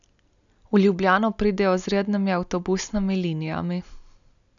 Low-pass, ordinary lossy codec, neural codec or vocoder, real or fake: 7.2 kHz; none; none; real